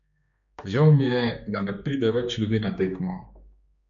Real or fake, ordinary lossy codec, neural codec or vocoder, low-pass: fake; MP3, 96 kbps; codec, 16 kHz, 2 kbps, X-Codec, HuBERT features, trained on general audio; 7.2 kHz